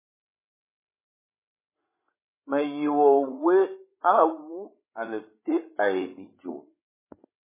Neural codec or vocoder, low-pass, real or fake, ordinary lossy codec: codec, 16 kHz, 16 kbps, FreqCodec, larger model; 3.6 kHz; fake; MP3, 16 kbps